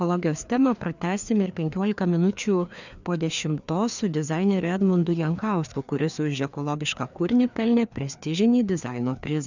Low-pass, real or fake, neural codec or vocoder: 7.2 kHz; fake; codec, 16 kHz, 2 kbps, FreqCodec, larger model